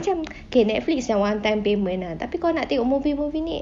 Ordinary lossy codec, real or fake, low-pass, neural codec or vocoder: none; real; none; none